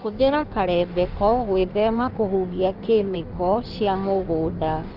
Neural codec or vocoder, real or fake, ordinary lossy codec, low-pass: codec, 16 kHz in and 24 kHz out, 1.1 kbps, FireRedTTS-2 codec; fake; Opus, 24 kbps; 5.4 kHz